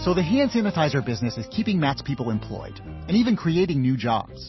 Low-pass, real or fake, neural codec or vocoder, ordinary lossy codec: 7.2 kHz; real; none; MP3, 24 kbps